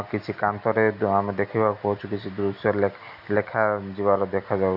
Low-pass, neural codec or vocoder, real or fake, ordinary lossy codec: 5.4 kHz; none; real; AAC, 48 kbps